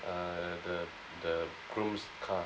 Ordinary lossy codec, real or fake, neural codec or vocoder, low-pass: none; real; none; none